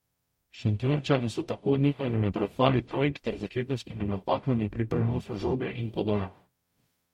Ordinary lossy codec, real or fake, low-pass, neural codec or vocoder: MP3, 64 kbps; fake; 19.8 kHz; codec, 44.1 kHz, 0.9 kbps, DAC